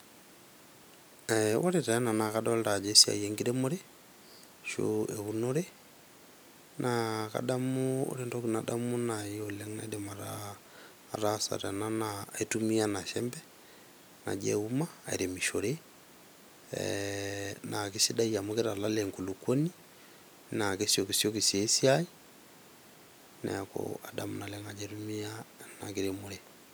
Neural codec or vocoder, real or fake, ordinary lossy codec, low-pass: none; real; none; none